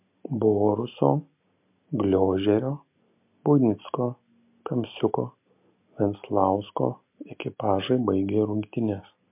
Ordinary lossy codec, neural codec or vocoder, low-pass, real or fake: MP3, 32 kbps; none; 3.6 kHz; real